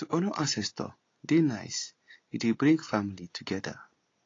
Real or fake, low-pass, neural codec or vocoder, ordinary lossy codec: real; 7.2 kHz; none; AAC, 32 kbps